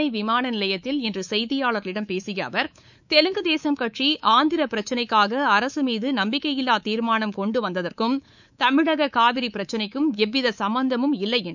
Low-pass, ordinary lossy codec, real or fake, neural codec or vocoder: 7.2 kHz; none; fake; codec, 24 kHz, 3.1 kbps, DualCodec